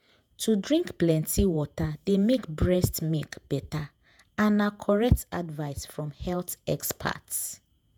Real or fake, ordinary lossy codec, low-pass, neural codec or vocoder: fake; none; none; vocoder, 48 kHz, 128 mel bands, Vocos